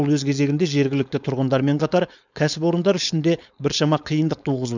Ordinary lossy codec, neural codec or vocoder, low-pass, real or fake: none; codec, 16 kHz, 4.8 kbps, FACodec; 7.2 kHz; fake